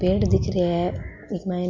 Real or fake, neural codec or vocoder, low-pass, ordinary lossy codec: real; none; 7.2 kHz; MP3, 48 kbps